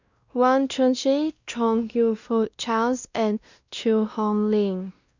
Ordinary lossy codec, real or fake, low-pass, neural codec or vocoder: Opus, 64 kbps; fake; 7.2 kHz; codec, 16 kHz, 1 kbps, X-Codec, WavLM features, trained on Multilingual LibriSpeech